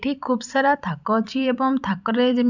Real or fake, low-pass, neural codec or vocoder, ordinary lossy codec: fake; 7.2 kHz; vocoder, 44.1 kHz, 128 mel bands every 256 samples, BigVGAN v2; none